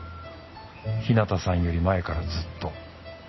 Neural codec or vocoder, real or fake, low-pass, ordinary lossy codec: none; real; 7.2 kHz; MP3, 24 kbps